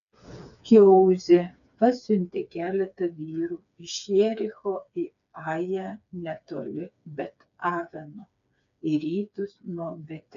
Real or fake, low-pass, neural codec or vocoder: fake; 7.2 kHz; codec, 16 kHz, 4 kbps, FreqCodec, smaller model